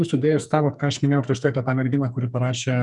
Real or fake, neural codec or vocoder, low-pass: fake; codec, 44.1 kHz, 2.6 kbps, SNAC; 10.8 kHz